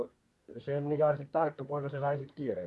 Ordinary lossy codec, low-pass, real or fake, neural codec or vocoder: none; none; fake; codec, 24 kHz, 1 kbps, SNAC